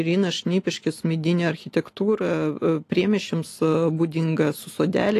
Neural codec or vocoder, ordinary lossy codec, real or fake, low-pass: none; AAC, 48 kbps; real; 14.4 kHz